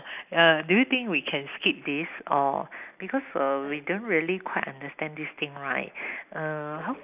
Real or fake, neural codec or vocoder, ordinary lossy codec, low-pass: real; none; none; 3.6 kHz